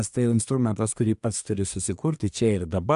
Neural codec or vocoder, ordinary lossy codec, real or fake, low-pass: codec, 24 kHz, 1 kbps, SNAC; AAC, 96 kbps; fake; 10.8 kHz